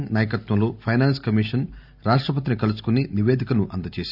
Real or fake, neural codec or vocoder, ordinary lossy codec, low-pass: real; none; none; 5.4 kHz